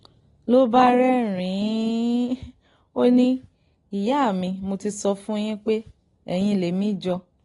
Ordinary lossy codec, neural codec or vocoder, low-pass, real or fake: AAC, 32 kbps; none; 10.8 kHz; real